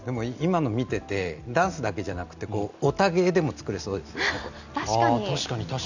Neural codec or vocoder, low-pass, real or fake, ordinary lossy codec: none; 7.2 kHz; real; none